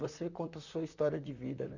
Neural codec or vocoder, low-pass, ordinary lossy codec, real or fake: vocoder, 44.1 kHz, 128 mel bands, Pupu-Vocoder; 7.2 kHz; none; fake